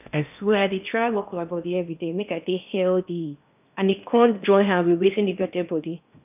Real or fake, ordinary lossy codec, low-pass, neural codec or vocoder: fake; none; 3.6 kHz; codec, 16 kHz in and 24 kHz out, 0.8 kbps, FocalCodec, streaming, 65536 codes